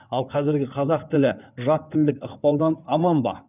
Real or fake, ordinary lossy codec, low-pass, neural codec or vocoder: fake; none; 3.6 kHz; codec, 16 kHz in and 24 kHz out, 2.2 kbps, FireRedTTS-2 codec